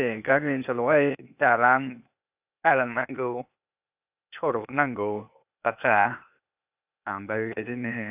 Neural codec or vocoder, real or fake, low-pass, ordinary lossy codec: codec, 16 kHz, 0.8 kbps, ZipCodec; fake; 3.6 kHz; none